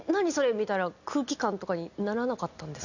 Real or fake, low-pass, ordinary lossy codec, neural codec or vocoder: real; 7.2 kHz; none; none